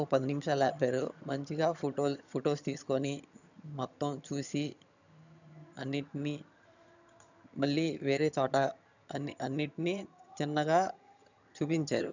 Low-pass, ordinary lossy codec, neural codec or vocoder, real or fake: 7.2 kHz; none; vocoder, 22.05 kHz, 80 mel bands, HiFi-GAN; fake